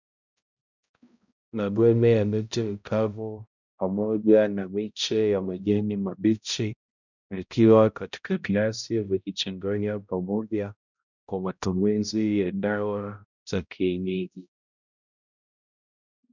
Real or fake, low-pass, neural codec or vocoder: fake; 7.2 kHz; codec, 16 kHz, 0.5 kbps, X-Codec, HuBERT features, trained on balanced general audio